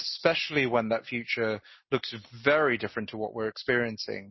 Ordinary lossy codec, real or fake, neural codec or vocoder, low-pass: MP3, 24 kbps; real; none; 7.2 kHz